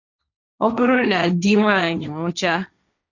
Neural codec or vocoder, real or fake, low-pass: codec, 16 kHz, 1.1 kbps, Voila-Tokenizer; fake; 7.2 kHz